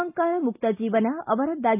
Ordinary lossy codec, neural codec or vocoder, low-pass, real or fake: AAC, 32 kbps; none; 3.6 kHz; real